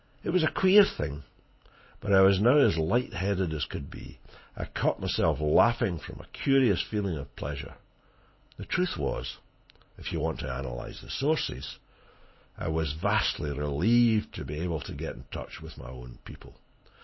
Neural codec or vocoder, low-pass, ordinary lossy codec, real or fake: none; 7.2 kHz; MP3, 24 kbps; real